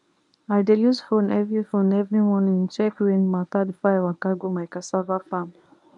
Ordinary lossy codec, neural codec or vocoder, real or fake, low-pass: none; codec, 24 kHz, 0.9 kbps, WavTokenizer, medium speech release version 1; fake; 10.8 kHz